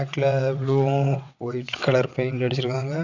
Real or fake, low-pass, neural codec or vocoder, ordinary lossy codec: fake; 7.2 kHz; vocoder, 22.05 kHz, 80 mel bands, WaveNeXt; AAC, 48 kbps